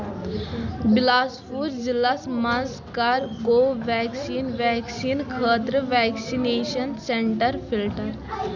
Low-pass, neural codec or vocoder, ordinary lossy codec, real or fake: 7.2 kHz; none; none; real